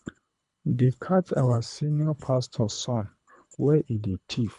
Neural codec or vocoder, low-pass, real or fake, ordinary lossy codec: codec, 24 kHz, 3 kbps, HILCodec; 10.8 kHz; fake; MP3, 96 kbps